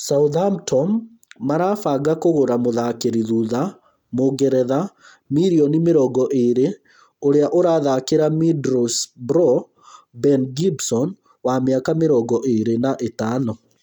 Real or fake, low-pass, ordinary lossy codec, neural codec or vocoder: real; 19.8 kHz; none; none